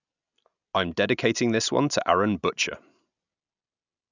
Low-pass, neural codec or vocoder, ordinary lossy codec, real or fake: 7.2 kHz; none; none; real